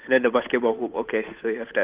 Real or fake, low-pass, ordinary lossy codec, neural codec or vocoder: fake; 3.6 kHz; Opus, 32 kbps; codec, 16 kHz, 8 kbps, FunCodec, trained on Chinese and English, 25 frames a second